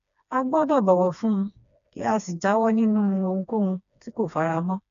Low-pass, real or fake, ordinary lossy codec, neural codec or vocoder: 7.2 kHz; fake; MP3, 96 kbps; codec, 16 kHz, 2 kbps, FreqCodec, smaller model